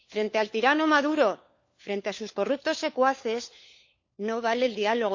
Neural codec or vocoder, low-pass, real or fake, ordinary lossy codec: codec, 16 kHz, 4 kbps, FunCodec, trained on LibriTTS, 50 frames a second; 7.2 kHz; fake; MP3, 48 kbps